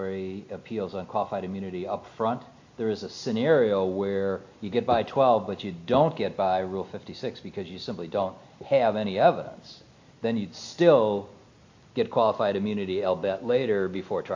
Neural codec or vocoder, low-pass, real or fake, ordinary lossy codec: none; 7.2 kHz; real; AAC, 48 kbps